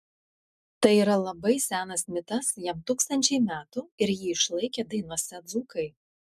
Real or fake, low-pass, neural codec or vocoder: real; 14.4 kHz; none